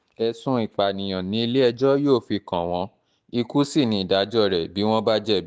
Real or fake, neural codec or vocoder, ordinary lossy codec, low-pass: real; none; none; none